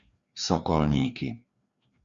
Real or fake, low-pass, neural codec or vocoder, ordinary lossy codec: fake; 7.2 kHz; codec, 16 kHz, 2 kbps, FreqCodec, larger model; Opus, 64 kbps